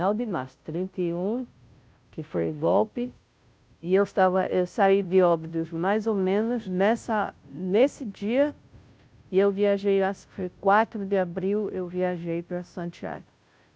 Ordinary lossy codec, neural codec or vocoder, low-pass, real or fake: none; codec, 16 kHz, 0.5 kbps, FunCodec, trained on Chinese and English, 25 frames a second; none; fake